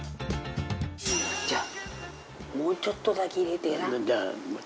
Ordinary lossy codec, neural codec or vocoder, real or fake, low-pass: none; none; real; none